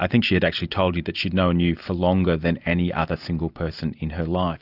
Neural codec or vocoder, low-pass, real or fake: none; 5.4 kHz; real